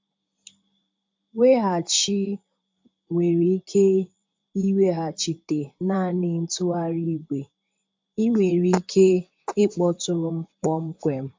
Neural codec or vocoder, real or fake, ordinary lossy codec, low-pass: vocoder, 44.1 kHz, 128 mel bands, Pupu-Vocoder; fake; MP3, 64 kbps; 7.2 kHz